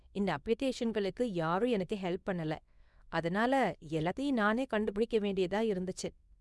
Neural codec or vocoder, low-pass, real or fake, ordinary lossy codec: codec, 24 kHz, 0.9 kbps, WavTokenizer, small release; none; fake; none